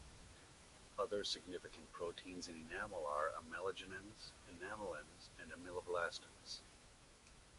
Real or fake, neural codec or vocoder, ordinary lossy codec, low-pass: fake; autoencoder, 48 kHz, 128 numbers a frame, DAC-VAE, trained on Japanese speech; MP3, 48 kbps; 14.4 kHz